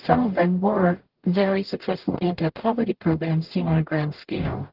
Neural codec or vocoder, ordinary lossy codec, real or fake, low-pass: codec, 44.1 kHz, 0.9 kbps, DAC; Opus, 16 kbps; fake; 5.4 kHz